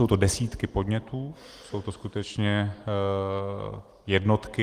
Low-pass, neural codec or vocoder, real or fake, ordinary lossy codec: 14.4 kHz; none; real; Opus, 24 kbps